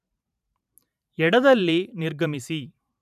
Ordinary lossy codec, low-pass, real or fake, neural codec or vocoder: none; 14.4 kHz; fake; vocoder, 44.1 kHz, 128 mel bands every 512 samples, BigVGAN v2